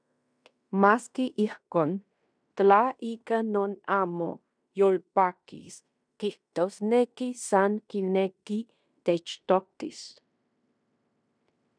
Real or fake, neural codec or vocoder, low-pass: fake; codec, 16 kHz in and 24 kHz out, 0.9 kbps, LongCat-Audio-Codec, fine tuned four codebook decoder; 9.9 kHz